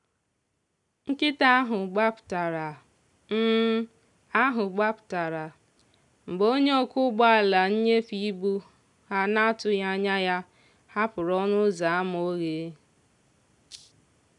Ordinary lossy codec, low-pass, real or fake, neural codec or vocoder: none; 10.8 kHz; real; none